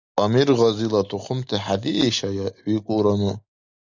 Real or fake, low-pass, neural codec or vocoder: real; 7.2 kHz; none